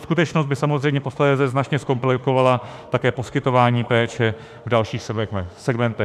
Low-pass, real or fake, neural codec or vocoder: 14.4 kHz; fake; autoencoder, 48 kHz, 32 numbers a frame, DAC-VAE, trained on Japanese speech